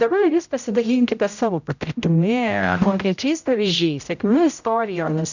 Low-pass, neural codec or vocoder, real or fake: 7.2 kHz; codec, 16 kHz, 0.5 kbps, X-Codec, HuBERT features, trained on general audio; fake